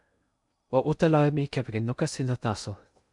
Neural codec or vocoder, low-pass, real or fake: codec, 16 kHz in and 24 kHz out, 0.6 kbps, FocalCodec, streaming, 2048 codes; 10.8 kHz; fake